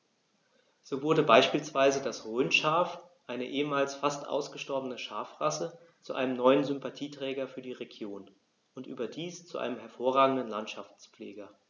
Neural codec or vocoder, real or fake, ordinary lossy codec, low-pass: none; real; none; 7.2 kHz